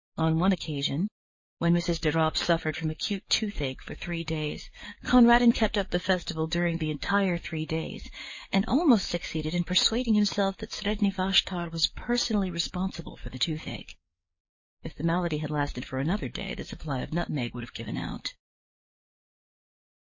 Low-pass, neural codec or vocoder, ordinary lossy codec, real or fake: 7.2 kHz; codec, 44.1 kHz, 7.8 kbps, DAC; MP3, 32 kbps; fake